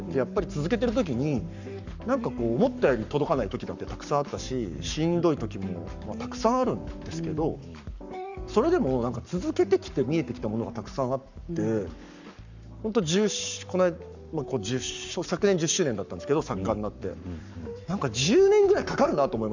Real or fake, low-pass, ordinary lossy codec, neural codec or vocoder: fake; 7.2 kHz; none; codec, 44.1 kHz, 7.8 kbps, Pupu-Codec